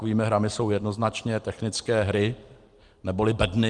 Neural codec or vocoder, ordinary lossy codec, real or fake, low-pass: none; Opus, 24 kbps; real; 10.8 kHz